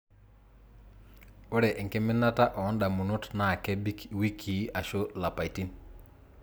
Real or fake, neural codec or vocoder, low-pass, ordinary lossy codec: real; none; none; none